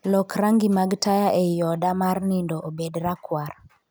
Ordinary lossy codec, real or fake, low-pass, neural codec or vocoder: none; real; none; none